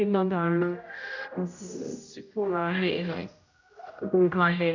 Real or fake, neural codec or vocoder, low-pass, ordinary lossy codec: fake; codec, 16 kHz, 0.5 kbps, X-Codec, HuBERT features, trained on general audio; 7.2 kHz; AAC, 48 kbps